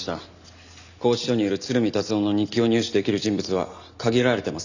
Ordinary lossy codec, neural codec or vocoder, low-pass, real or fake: none; none; 7.2 kHz; real